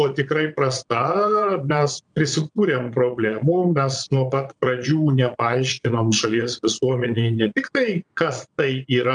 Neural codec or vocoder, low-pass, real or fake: vocoder, 22.05 kHz, 80 mel bands, Vocos; 9.9 kHz; fake